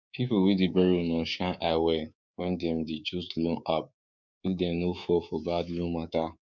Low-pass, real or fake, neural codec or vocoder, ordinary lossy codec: 7.2 kHz; fake; codec, 24 kHz, 3.1 kbps, DualCodec; none